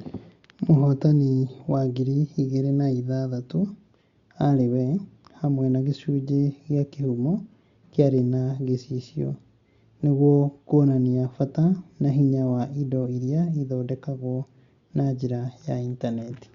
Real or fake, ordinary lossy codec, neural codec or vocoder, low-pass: real; Opus, 64 kbps; none; 7.2 kHz